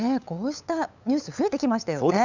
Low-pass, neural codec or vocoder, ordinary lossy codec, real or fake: 7.2 kHz; codec, 16 kHz, 8 kbps, FunCodec, trained on LibriTTS, 25 frames a second; none; fake